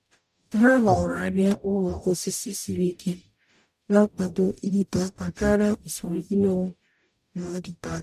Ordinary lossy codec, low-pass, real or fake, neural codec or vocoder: MP3, 96 kbps; 14.4 kHz; fake; codec, 44.1 kHz, 0.9 kbps, DAC